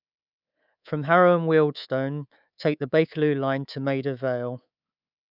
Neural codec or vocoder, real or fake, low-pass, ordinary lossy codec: codec, 24 kHz, 3.1 kbps, DualCodec; fake; 5.4 kHz; none